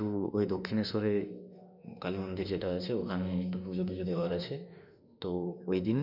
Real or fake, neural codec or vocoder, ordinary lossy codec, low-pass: fake; autoencoder, 48 kHz, 32 numbers a frame, DAC-VAE, trained on Japanese speech; none; 5.4 kHz